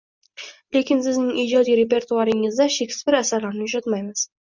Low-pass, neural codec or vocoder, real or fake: 7.2 kHz; none; real